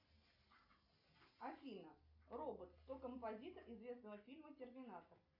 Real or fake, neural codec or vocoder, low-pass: real; none; 5.4 kHz